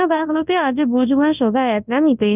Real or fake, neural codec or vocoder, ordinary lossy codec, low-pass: fake; codec, 24 kHz, 0.9 kbps, WavTokenizer, large speech release; none; 3.6 kHz